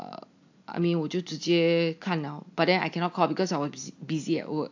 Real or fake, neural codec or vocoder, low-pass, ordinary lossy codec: real; none; 7.2 kHz; AAC, 48 kbps